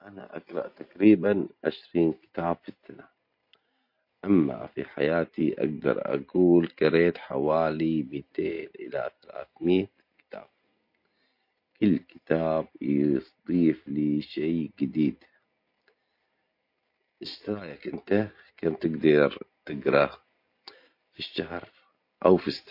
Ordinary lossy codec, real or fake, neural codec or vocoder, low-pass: MP3, 32 kbps; real; none; 5.4 kHz